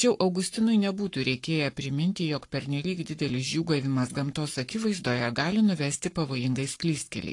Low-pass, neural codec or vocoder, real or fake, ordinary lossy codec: 10.8 kHz; codec, 44.1 kHz, 7.8 kbps, Pupu-Codec; fake; AAC, 48 kbps